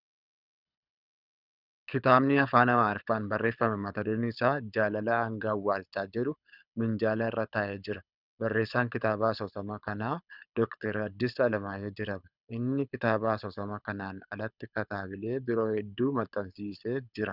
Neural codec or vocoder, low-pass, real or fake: codec, 24 kHz, 6 kbps, HILCodec; 5.4 kHz; fake